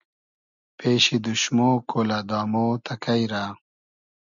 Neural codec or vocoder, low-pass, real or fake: none; 7.2 kHz; real